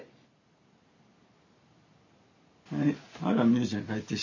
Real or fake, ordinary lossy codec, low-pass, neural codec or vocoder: real; none; 7.2 kHz; none